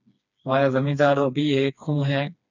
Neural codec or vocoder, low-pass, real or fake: codec, 16 kHz, 2 kbps, FreqCodec, smaller model; 7.2 kHz; fake